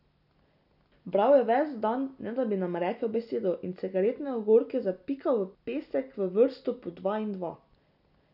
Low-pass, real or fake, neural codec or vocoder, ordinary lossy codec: 5.4 kHz; real; none; none